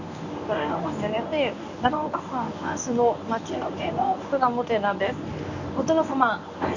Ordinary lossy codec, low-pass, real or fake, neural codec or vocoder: none; 7.2 kHz; fake; codec, 24 kHz, 0.9 kbps, WavTokenizer, medium speech release version 1